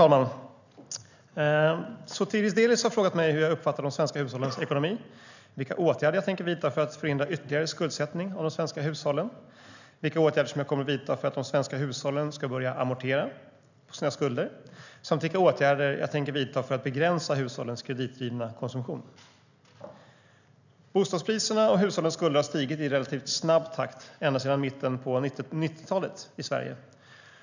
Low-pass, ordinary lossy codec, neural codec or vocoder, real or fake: 7.2 kHz; none; none; real